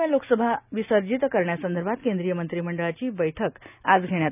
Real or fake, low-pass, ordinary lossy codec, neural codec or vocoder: real; 3.6 kHz; none; none